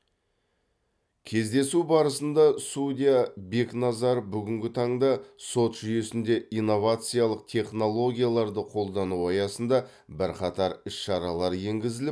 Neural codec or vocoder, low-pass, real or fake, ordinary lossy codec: none; none; real; none